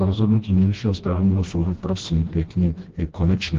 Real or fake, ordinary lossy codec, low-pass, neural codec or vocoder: fake; Opus, 16 kbps; 7.2 kHz; codec, 16 kHz, 1 kbps, FreqCodec, smaller model